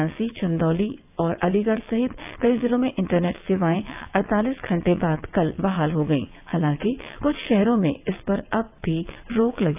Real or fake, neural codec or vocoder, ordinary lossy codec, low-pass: fake; vocoder, 22.05 kHz, 80 mel bands, WaveNeXt; AAC, 32 kbps; 3.6 kHz